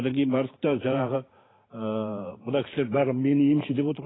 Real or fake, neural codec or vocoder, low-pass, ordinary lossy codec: fake; vocoder, 44.1 kHz, 128 mel bands every 512 samples, BigVGAN v2; 7.2 kHz; AAC, 16 kbps